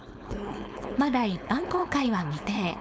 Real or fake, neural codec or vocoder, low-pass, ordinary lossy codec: fake; codec, 16 kHz, 4.8 kbps, FACodec; none; none